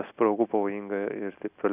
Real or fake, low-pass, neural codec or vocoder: real; 3.6 kHz; none